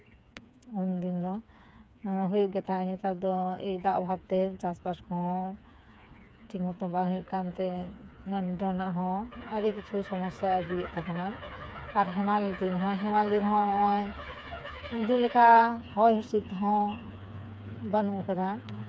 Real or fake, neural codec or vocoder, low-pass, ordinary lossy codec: fake; codec, 16 kHz, 4 kbps, FreqCodec, smaller model; none; none